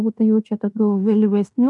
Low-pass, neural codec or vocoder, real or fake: 10.8 kHz; codec, 16 kHz in and 24 kHz out, 0.9 kbps, LongCat-Audio-Codec, fine tuned four codebook decoder; fake